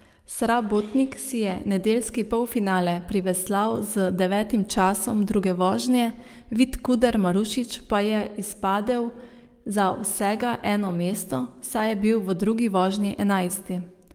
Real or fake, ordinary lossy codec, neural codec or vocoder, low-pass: fake; Opus, 32 kbps; codec, 44.1 kHz, 7.8 kbps, DAC; 19.8 kHz